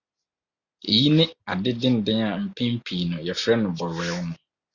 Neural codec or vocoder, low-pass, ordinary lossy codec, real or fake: none; 7.2 kHz; Opus, 64 kbps; real